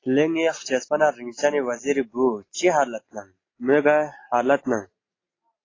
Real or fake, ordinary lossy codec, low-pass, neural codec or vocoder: real; AAC, 32 kbps; 7.2 kHz; none